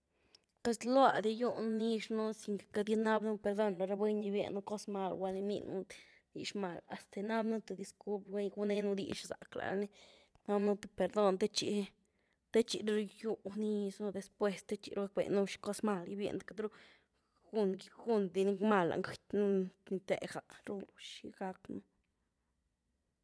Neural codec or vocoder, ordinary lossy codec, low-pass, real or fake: vocoder, 22.05 kHz, 80 mel bands, Vocos; none; none; fake